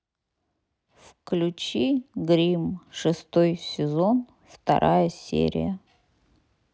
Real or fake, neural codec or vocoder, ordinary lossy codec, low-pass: real; none; none; none